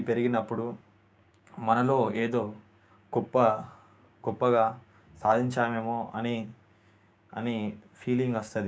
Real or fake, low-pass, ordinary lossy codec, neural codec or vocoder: fake; none; none; codec, 16 kHz, 6 kbps, DAC